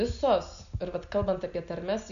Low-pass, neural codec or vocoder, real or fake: 7.2 kHz; none; real